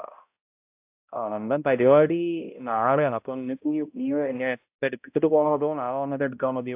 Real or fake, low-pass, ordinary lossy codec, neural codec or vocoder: fake; 3.6 kHz; AAC, 32 kbps; codec, 16 kHz, 0.5 kbps, X-Codec, HuBERT features, trained on balanced general audio